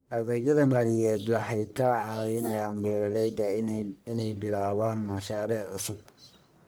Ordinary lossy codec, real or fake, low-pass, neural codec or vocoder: none; fake; none; codec, 44.1 kHz, 1.7 kbps, Pupu-Codec